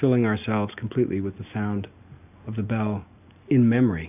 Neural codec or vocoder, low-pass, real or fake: none; 3.6 kHz; real